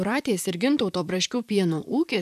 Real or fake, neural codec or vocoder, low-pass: fake; vocoder, 44.1 kHz, 128 mel bands, Pupu-Vocoder; 14.4 kHz